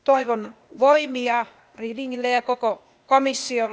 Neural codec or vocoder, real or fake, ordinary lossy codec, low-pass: codec, 16 kHz, 0.8 kbps, ZipCodec; fake; none; none